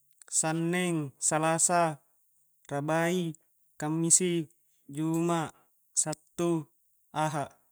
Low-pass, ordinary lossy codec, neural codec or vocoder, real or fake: none; none; none; real